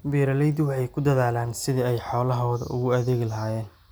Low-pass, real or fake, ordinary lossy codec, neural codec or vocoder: none; real; none; none